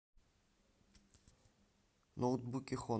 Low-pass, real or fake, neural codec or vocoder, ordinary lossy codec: none; real; none; none